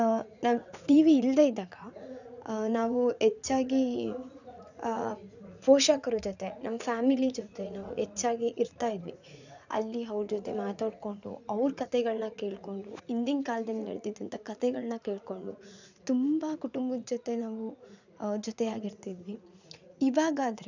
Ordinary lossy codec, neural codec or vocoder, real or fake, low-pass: none; vocoder, 44.1 kHz, 80 mel bands, Vocos; fake; 7.2 kHz